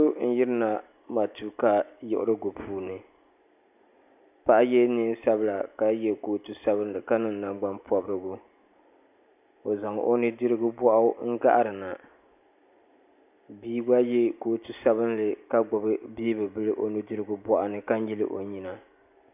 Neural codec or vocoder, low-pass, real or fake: none; 3.6 kHz; real